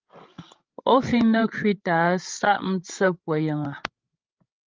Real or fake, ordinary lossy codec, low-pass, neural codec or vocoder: fake; Opus, 24 kbps; 7.2 kHz; codec, 16 kHz, 16 kbps, FreqCodec, larger model